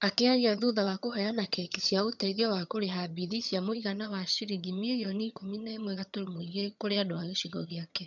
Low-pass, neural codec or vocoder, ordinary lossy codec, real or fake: 7.2 kHz; vocoder, 22.05 kHz, 80 mel bands, HiFi-GAN; none; fake